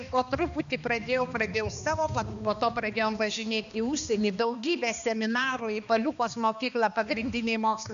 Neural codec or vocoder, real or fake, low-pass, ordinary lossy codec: codec, 16 kHz, 2 kbps, X-Codec, HuBERT features, trained on balanced general audio; fake; 7.2 kHz; AAC, 96 kbps